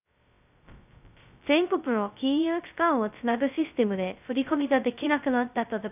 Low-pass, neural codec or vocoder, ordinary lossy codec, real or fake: 3.6 kHz; codec, 16 kHz, 0.2 kbps, FocalCodec; none; fake